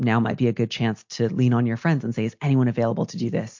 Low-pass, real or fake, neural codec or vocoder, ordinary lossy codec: 7.2 kHz; real; none; MP3, 48 kbps